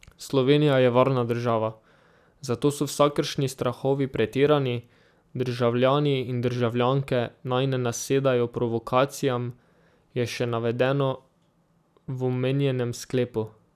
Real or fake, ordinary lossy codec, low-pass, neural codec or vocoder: real; none; 14.4 kHz; none